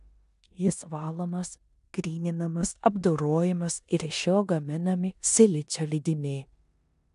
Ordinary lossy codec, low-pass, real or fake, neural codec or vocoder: AAC, 96 kbps; 10.8 kHz; fake; codec, 16 kHz in and 24 kHz out, 0.9 kbps, LongCat-Audio-Codec, four codebook decoder